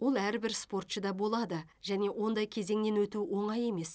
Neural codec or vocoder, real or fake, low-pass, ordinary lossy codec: none; real; none; none